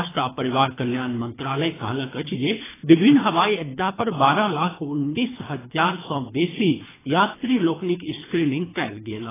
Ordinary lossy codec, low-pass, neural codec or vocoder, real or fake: AAC, 16 kbps; 3.6 kHz; codec, 24 kHz, 3 kbps, HILCodec; fake